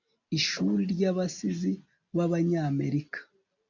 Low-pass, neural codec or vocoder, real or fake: 7.2 kHz; none; real